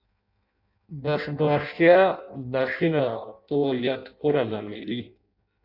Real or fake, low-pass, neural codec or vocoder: fake; 5.4 kHz; codec, 16 kHz in and 24 kHz out, 0.6 kbps, FireRedTTS-2 codec